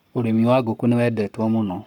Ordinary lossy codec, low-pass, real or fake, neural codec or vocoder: none; 19.8 kHz; fake; codec, 44.1 kHz, 7.8 kbps, Pupu-Codec